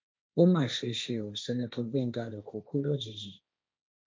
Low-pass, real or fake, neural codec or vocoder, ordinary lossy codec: none; fake; codec, 16 kHz, 1.1 kbps, Voila-Tokenizer; none